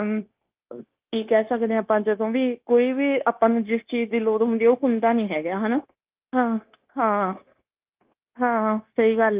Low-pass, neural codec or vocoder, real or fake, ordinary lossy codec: 3.6 kHz; codec, 16 kHz, 0.9 kbps, LongCat-Audio-Codec; fake; Opus, 32 kbps